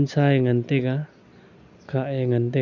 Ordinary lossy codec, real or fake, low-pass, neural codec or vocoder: none; real; 7.2 kHz; none